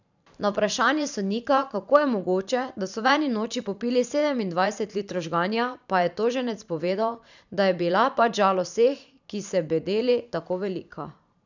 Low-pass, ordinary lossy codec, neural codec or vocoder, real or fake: 7.2 kHz; none; vocoder, 44.1 kHz, 128 mel bands every 512 samples, BigVGAN v2; fake